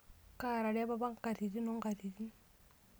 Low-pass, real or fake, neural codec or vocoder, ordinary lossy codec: none; real; none; none